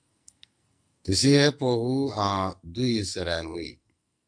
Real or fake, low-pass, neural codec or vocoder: fake; 9.9 kHz; codec, 44.1 kHz, 2.6 kbps, SNAC